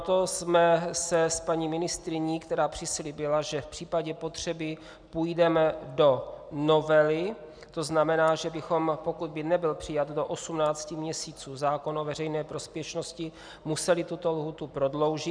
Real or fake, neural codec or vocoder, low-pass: real; none; 9.9 kHz